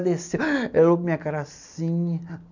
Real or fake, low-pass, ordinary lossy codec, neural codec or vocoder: real; 7.2 kHz; none; none